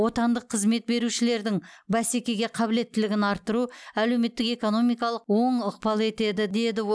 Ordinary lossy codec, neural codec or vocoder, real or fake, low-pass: none; none; real; none